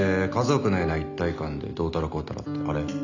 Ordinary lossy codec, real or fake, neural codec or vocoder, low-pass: none; real; none; 7.2 kHz